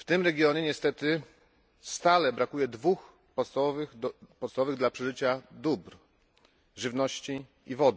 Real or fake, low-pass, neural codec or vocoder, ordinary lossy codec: real; none; none; none